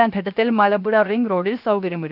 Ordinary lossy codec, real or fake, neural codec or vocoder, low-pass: none; fake; codec, 16 kHz, 0.7 kbps, FocalCodec; 5.4 kHz